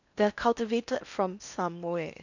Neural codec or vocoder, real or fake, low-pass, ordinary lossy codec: codec, 16 kHz in and 24 kHz out, 0.6 kbps, FocalCodec, streaming, 4096 codes; fake; 7.2 kHz; none